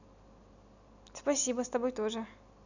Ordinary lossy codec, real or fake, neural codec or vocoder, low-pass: none; real; none; 7.2 kHz